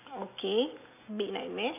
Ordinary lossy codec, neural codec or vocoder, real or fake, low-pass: none; none; real; 3.6 kHz